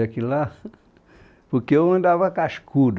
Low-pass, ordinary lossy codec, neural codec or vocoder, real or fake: none; none; none; real